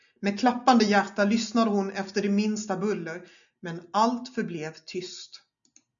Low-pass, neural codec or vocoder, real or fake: 7.2 kHz; none; real